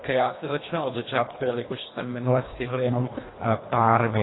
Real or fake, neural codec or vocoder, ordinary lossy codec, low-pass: fake; codec, 24 kHz, 1.5 kbps, HILCodec; AAC, 16 kbps; 7.2 kHz